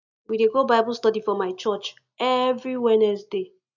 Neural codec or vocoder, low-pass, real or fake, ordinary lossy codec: none; 7.2 kHz; real; none